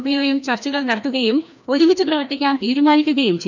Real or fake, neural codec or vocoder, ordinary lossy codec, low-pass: fake; codec, 16 kHz, 1 kbps, FreqCodec, larger model; none; 7.2 kHz